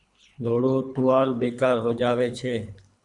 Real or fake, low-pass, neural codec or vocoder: fake; 10.8 kHz; codec, 24 kHz, 3 kbps, HILCodec